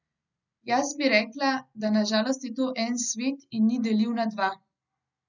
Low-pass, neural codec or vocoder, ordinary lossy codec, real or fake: 7.2 kHz; none; none; real